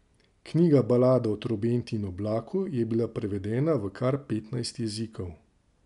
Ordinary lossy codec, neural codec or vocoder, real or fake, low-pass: none; none; real; 10.8 kHz